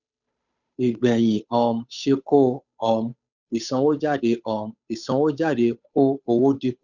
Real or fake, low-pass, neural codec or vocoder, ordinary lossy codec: fake; 7.2 kHz; codec, 16 kHz, 8 kbps, FunCodec, trained on Chinese and English, 25 frames a second; none